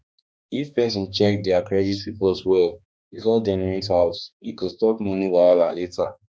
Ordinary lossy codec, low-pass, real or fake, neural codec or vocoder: none; none; fake; codec, 16 kHz, 2 kbps, X-Codec, HuBERT features, trained on balanced general audio